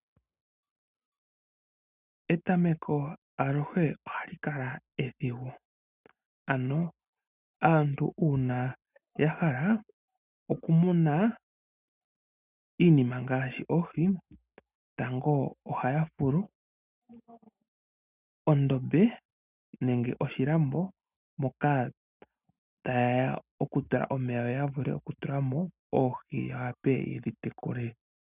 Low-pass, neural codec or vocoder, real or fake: 3.6 kHz; none; real